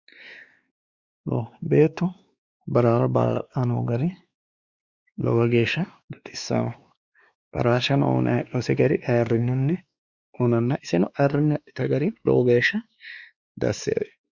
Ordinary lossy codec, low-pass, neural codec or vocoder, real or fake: Opus, 64 kbps; 7.2 kHz; codec, 16 kHz, 2 kbps, X-Codec, WavLM features, trained on Multilingual LibriSpeech; fake